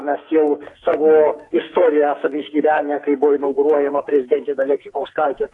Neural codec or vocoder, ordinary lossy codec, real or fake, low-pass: codec, 44.1 kHz, 2.6 kbps, SNAC; AAC, 48 kbps; fake; 10.8 kHz